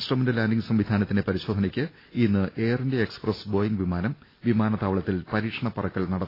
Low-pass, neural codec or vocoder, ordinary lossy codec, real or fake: 5.4 kHz; none; AAC, 24 kbps; real